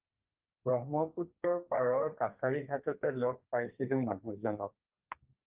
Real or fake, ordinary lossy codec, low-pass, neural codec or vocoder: fake; Opus, 32 kbps; 3.6 kHz; codec, 32 kHz, 1.9 kbps, SNAC